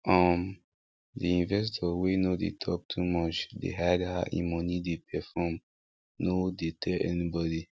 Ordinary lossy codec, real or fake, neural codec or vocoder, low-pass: none; real; none; none